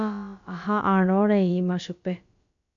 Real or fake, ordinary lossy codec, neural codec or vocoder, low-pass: fake; MP3, 64 kbps; codec, 16 kHz, about 1 kbps, DyCAST, with the encoder's durations; 7.2 kHz